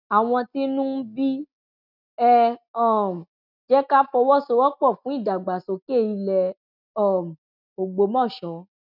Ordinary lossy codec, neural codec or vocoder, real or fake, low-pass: none; none; real; 5.4 kHz